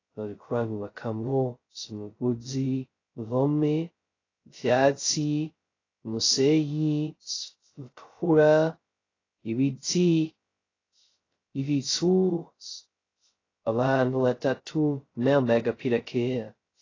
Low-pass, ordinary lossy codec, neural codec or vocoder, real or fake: 7.2 kHz; AAC, 32 kbps; codec, 16 kHz, 0.2 kbps, FocalCodec; fake